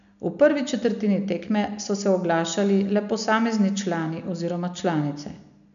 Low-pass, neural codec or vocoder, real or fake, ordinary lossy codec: 7.2 kHz; none; real; none